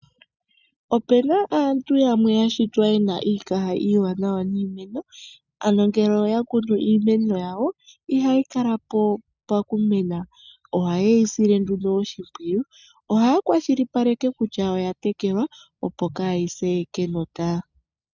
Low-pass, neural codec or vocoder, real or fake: 7.2 kHz; none; real